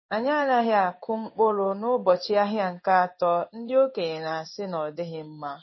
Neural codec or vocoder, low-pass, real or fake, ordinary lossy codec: codec, 16 kHz in and 24 kHz out, 1 kbps, XY-Tokenizer; 7.2 kHz; fake; MP3, 24 kbps